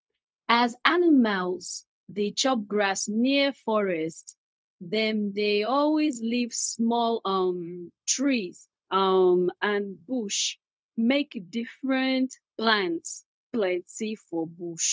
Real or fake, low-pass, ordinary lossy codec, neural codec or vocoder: fake; none; none; codec, 16 kHz, 0.4 kbps, LongCat-Audio-Codec